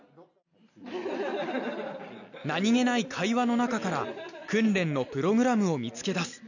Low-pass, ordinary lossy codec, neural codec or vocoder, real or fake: 7.2 kHz; none; none; real